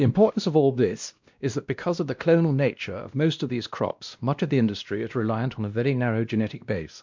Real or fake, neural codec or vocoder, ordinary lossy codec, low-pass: fake; codec, 16 kHz, 1 kbps, X-Codec, WavLM features, trained on Multilingual LibriSpeech; MP3, 64 kbps; 7.2 kHz